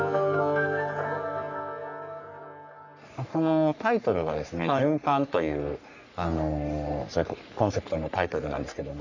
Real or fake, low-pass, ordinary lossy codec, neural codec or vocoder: fake; 7.2 kHz; none; codec, 44.1 kHz, 3.4 kbps, Pupu-Codec